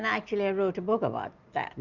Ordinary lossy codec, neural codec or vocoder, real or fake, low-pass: Opus, 64 kbps; none; real; 7.2 kHz